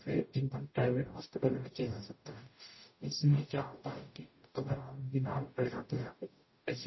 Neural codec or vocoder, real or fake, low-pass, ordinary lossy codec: codec, 44.1 kHz, 0.9 kbps, DAC; fake; 7.2 kHz; MP3, 24 kbps